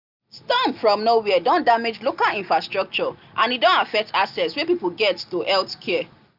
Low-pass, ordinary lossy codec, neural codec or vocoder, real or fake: 5.4 kHz; none; none; real